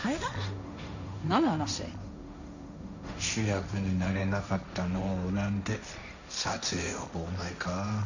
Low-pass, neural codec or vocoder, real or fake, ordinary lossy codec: 7.2 kHz; codec, 16 kHz, 1.1 kbps, Voila-Tokenizer; fake; none